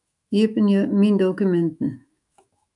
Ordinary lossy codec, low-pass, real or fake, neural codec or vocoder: MP3, 96 kbps; 10.8 kHz; fake; autoencoder, 48 kHz, 128 numbers a frame, DAC-VAE, trained on Japanese speech